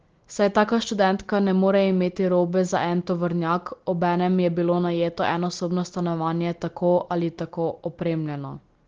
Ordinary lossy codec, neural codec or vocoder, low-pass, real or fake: Opus, 16 kbps; none; 7.2 kHz; real